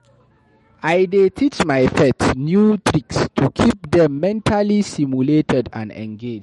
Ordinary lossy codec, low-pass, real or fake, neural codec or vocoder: MP3, 48 kbps; 19.8 kHz; fake; autoencoder, 48 kHz, 128 numbers a frame, DAC-VAE, trained on Japanese speech